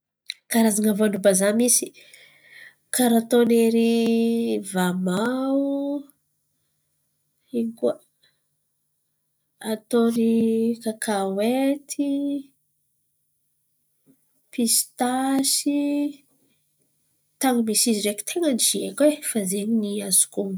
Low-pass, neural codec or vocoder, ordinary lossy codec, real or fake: none; none; none; real